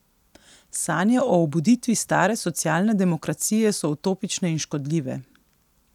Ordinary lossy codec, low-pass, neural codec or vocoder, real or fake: none; 19.8 kHz; none; real